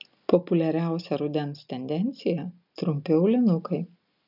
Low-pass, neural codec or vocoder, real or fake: 5.4 kHz; none; real